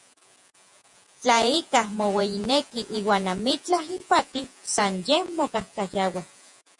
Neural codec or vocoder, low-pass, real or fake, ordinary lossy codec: vocoder, 48 kHz, 128 mel bands, Vocos; 10.8 kHz; fake; MP3, 96 kbps